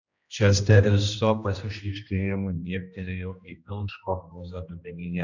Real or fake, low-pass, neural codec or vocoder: fake; 7.2 kHz; codec, 16 kHz, 1 kbps, X-Codec, HuBERT features, trained on general audio